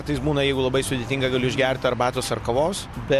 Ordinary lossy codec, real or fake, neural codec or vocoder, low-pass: MP3, 96 kbps; real; none; 14.4 kHz